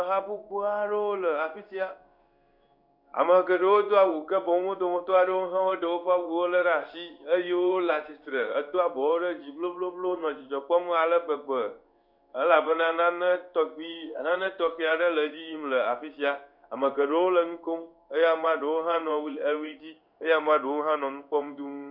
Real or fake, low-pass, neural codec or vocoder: fake; 5.4 kHz; codec, 16 kHz in and 24 kHz out, 1 kbps, XY-Tokenizer